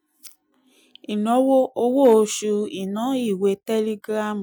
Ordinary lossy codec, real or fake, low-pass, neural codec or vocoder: none; real; none; none